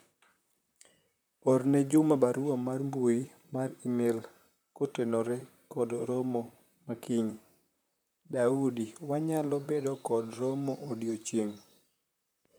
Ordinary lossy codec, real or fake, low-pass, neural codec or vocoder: none; fake; none; vocoder, 44.1 kHz, 128 mel bands, Pupu-Vocoder